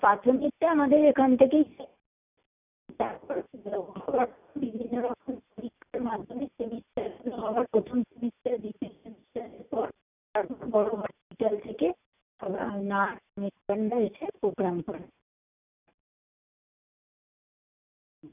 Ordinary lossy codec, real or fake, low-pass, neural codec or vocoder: none; real; 3.6 kHz; none